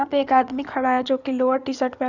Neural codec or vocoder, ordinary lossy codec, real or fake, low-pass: codec, 16 kHz in and 24 kHz out, 2.2 kbps, FireRedTTS-2 codec; none; fake; 7.2 kHz